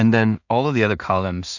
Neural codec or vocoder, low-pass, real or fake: codec, 16 kHz in and 24 kHz out, 0.4 kbps, LongCat-Audio-Codec, two codebook decoder; 7.2 kHz; fake